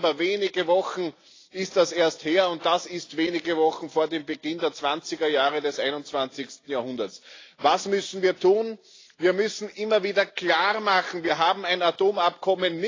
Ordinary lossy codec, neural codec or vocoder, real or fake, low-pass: AAC, 32 kbps; none; real; 7.2 kHz